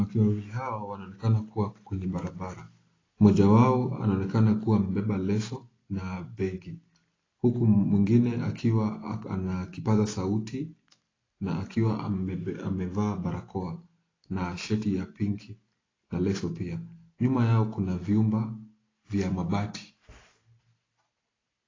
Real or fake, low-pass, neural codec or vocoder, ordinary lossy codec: real; 7.2 kHz; none; AAC, 32 kbps